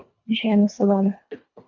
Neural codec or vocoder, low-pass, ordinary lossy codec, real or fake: codec, 24 kHz, 1.5 kbps, HILCodec; 7.2 kHz; MP3, 64 kbps; fake